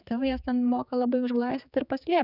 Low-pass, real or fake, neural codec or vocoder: 5.4 kHz; fake; codec, 16 kHz, 4 kbps, X-Codec, HuBERT features, trained on general audio